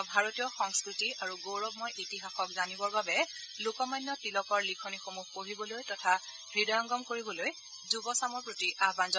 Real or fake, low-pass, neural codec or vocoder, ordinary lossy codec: real; none; none; none